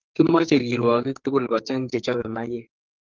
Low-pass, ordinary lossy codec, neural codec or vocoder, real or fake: 7.2 kHz; Opus, 24 kbps; codec, 44.1 kHz, 2.6 kbps, SNAC; fake